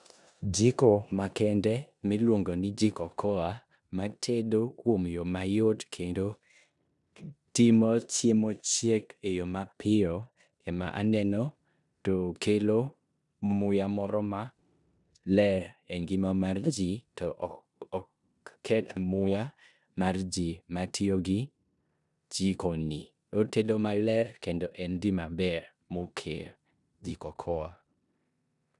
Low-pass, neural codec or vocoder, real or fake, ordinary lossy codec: 10.8 kHz; codec, 16 kHz in and 24 kHz out, 0.9 kbps, LongCat-Audio-Codec, four codebook decoder; fake; none